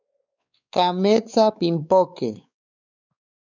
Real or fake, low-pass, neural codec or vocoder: fake; 7.2 kHz; codec, 16 kHz, 4 kbps, X-Codec, WavLM features, trained on Multilingual LibriSpeech